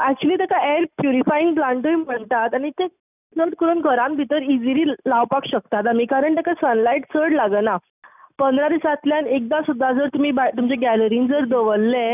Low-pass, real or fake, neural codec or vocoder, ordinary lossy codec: 3.6 kHz; real; none; none